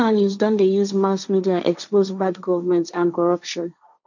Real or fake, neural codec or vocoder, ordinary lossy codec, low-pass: fake; codec, 16 kHz, 1.1 kbps, Voila-Tokenizer; none; 7.2 kHz